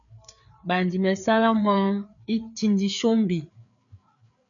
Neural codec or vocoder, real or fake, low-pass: codec, 16 kHz, 4 kbps, FreqCodec, larger model; fake; 7.2 kHz